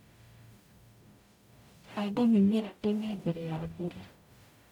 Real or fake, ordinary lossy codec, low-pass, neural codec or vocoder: fake; none; 19.8 kHz; codec, 44.1 kHz, 0.9 kbps, DAC